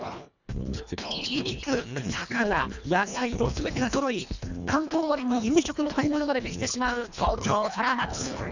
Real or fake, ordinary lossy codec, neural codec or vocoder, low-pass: fake; none; codec, 24 kHz, 1.5 kbps, HILCodec; 7.2 kHz